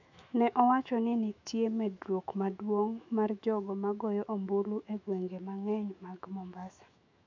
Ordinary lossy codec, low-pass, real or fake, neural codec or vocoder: AAC, 48 kbps; 7.2 kHz; fake; autoencoder, 48 kHz, 128 numbers a frame, DAC-VAE, trained on Japanese speech